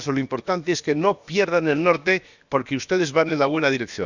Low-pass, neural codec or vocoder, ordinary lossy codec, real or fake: 7.2 kHz; codec, 16 kHz, about 1 kbps, DyCAST, with the encoder's durations; Opus, 64 kbps; fake